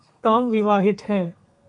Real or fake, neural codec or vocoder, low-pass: fake; codec, 32 kHz, 1.9 kbps, SNAC; 10.8 kHz